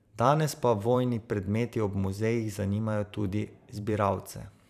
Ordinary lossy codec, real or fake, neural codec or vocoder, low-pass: none; fake; vocoder, 44.1 kHz, 128 mel bands every 512 samples, BigVGAN v2; 14.4 kHz